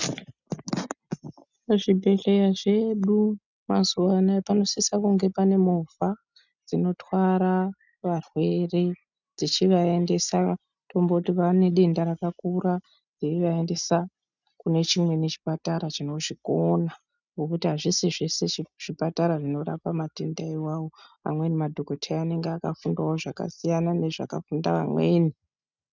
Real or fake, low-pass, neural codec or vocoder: real; 7.2 kHz; none